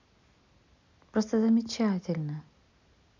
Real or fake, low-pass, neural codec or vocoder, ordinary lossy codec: real; 7.2 kHz; none; none